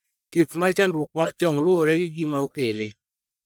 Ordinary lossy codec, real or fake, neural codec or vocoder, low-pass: none; fake; codec, 44.1 kHz, 1.7 kbps, Pupu-Codec; none